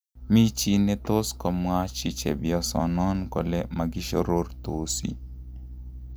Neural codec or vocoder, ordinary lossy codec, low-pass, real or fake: none; none; none; real